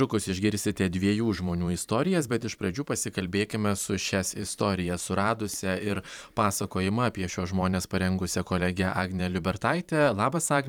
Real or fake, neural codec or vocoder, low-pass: fake; vocoder, 44.1 kHz, 128 mel bands every 512 samples, BigVGAN v2; 19.8 kHz